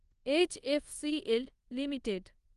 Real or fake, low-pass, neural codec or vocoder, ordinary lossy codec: fake; 10.8 kHz; codec, 24 kHz, 0.5 kbps, DualCodec; Opus, 24 kbps